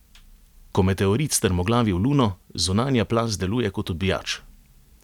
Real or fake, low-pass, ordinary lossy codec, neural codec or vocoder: real; 19.8 kHz; none; none